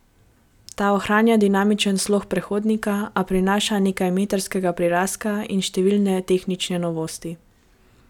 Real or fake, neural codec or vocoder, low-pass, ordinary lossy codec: real; none; 19.8 kHz; none